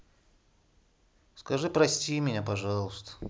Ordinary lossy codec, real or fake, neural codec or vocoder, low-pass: none; real; none; none